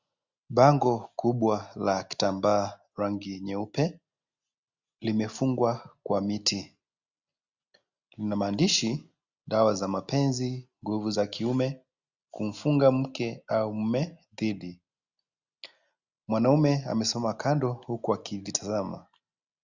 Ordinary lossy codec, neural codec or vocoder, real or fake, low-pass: Opus, 64 kbps; none; real; 7.2 kHz